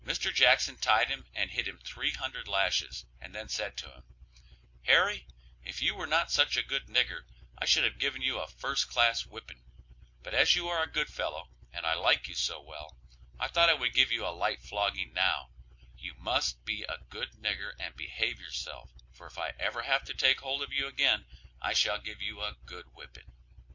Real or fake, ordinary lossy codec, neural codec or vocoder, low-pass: real; MP3, 48 kbps; none; 7.2 kHz